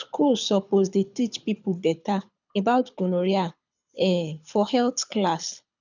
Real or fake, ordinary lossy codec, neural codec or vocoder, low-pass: fake; none; codec, 24 kHz, 6 kbps, HILCodec; 7.2 kHz